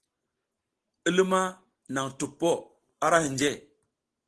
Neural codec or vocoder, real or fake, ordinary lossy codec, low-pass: none; real; Opus, 16 kbps; 10.8 kHz